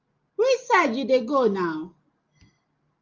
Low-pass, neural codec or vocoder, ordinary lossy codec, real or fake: 7.2 kHz; none; Opus, 32 kbps; real